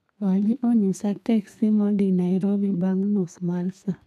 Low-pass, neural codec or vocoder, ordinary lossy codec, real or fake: 14.4 kHz; codec, 32 kHz, 1.9 kbps, SNAC; none; fake